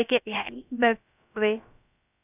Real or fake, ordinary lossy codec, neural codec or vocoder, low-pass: fake; none; codec, 16 kHz, about 1 kbps, DyCAST, with the encoder's durations; 3.6 kHz